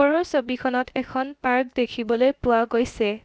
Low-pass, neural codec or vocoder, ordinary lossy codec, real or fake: none; codec, 16 kHz, about 1 kbps, DyCAST, with the encoder's durations; none; fake